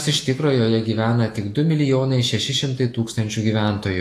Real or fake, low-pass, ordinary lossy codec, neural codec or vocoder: fake; 14.4 kHz; AAC, 48 kbps; autoencoder, 48 kHz, 128 numbers a frame, DAC-VAE, trained on Japanese speech